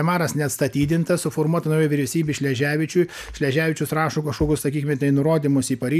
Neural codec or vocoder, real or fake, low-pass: none; real; 14.4 kHz